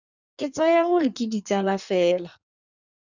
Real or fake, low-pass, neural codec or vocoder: fake; 7.2 kHz; codec, 16 kHz in and 24 kHz out, 1.1 kbps, FireRedTTS-2 codec